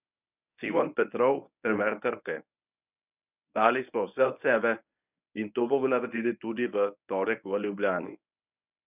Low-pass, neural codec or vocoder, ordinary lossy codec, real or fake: 3.6 kHz; codec, 24 kHz, 0.9 kbps, WavTokenizer, medium speech release version 1; none; fake